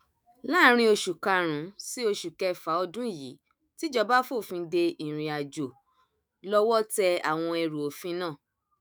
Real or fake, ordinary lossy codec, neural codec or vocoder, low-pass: fake; none; autoencoder, 48 kHz, 128 numbers a frame, DAC-VAE, trained on Japanese speech; none